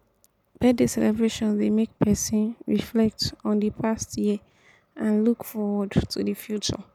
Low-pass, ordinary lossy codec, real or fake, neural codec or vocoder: 19.8 kHz; none; real; none